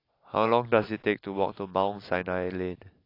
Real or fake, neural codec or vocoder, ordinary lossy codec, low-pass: real; none; AAC, 32 kbps; 5.4 kHz